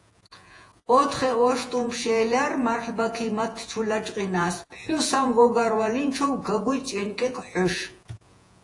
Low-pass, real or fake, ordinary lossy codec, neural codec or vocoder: 10.8 kHz; fake; AAC, 48 kbps; vocoder, 48 kHz, 128 mel bands, Vocos